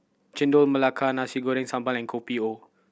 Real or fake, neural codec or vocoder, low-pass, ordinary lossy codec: real; none; none; none